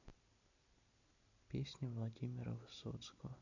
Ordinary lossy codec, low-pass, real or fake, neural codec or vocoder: none; 7.2 kHz; real; none